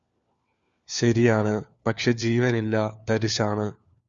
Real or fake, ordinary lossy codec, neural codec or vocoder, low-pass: fake; Opus, 64 kbps; codec, 16 kHz, 4 kbps, FunCodec, trained on LibriTTS, 50 frames a second; 7.2 kHz